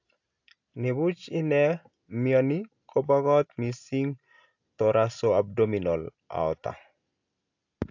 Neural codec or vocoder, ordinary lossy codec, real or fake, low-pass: none; AAC, 48 kbps; real; 7.2 kHz